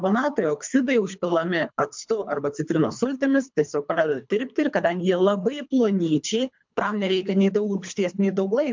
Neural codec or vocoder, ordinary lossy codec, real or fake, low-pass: codec, 24 kHz, 3 kbps, HILCodec; MP3, 64 kbps; fake; 7.2 kHz